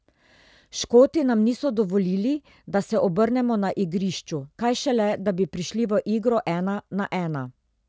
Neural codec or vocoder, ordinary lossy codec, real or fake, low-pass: none; none; real; none